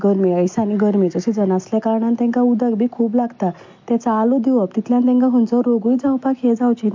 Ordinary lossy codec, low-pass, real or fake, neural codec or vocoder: MP3, 48 kbps; 7.2 kHz; real; none